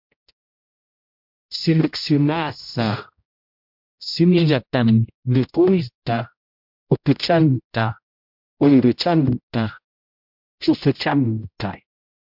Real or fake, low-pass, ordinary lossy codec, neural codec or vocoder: fake; 5.4 kHz; MP3, 48 kbps; codec, 16 kHz, 0.5 kbps, X-Codec, HuBERT features, trained on general audio